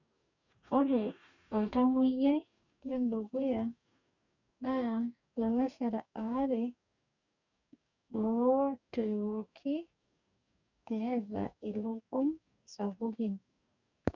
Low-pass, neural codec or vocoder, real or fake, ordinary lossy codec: 7.2 kHz; codec, 44.1 kHz, 2.6 kbps, DAC; fake; none